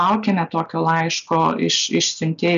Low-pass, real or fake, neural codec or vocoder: 7.2 kHz; real; none